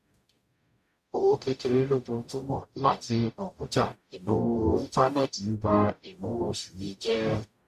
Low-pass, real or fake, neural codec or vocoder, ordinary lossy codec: 14.4 kHz; fake; codec, 44.1 kHz, 0.9 kbps, DAC; none